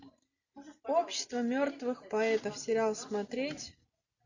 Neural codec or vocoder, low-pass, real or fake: none; 7.2 kHz; real